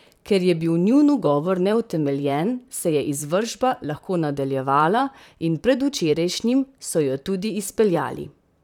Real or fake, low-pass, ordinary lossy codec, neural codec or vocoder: fake; 19.8 kHz; none; vocoder, 44.1 kHz, 128 mel bands, Pupu-Vocoder